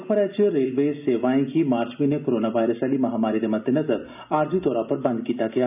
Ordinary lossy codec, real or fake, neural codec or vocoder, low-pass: none; real; none; 3.6 kHz